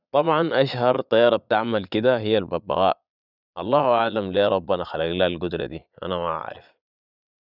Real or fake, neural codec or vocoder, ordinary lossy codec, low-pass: fake; vocoder, 22.05 kHz, 80 mel bands, Vocos; none; 5.4 kHz